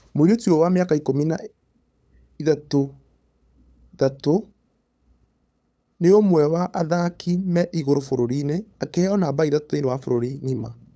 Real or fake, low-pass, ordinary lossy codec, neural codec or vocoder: fake; none; none; codec, 16 kHz, 8 kbps, FunCodec, trained on LibriTTS, 25 frames a second